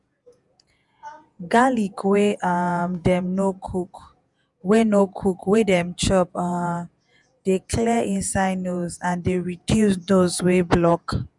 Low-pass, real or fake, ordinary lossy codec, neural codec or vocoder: 10.8 kHz; fake; none; vocoder, 48 kHz, 128 mel bands, Vocos